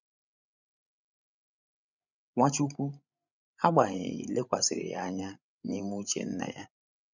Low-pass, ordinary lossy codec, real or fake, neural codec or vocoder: 7.2 kHz; none; fake; codec, 16 kHz, 16 kbps, FreqCodec, larger model